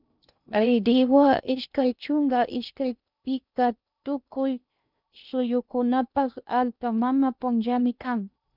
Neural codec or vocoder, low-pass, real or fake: codec, 16 kHz in and 24 kHz out, 0.6 kbps, FocalCodec, streaming, 2048 codes; 5.4 kHz; fake